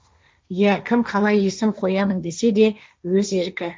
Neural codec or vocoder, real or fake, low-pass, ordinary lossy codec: codec, 16 kHz, 1.1 kbps, Voila-Tokenizer; fake; none; none